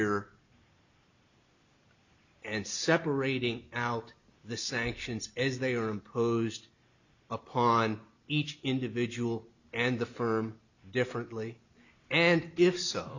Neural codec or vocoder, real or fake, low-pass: none; real; 7.2 kHz